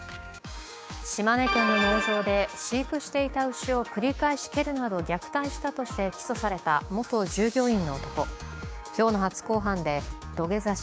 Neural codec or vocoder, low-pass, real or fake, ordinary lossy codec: codec, 16 kHz, 6 kbps, DAC; none; fake; none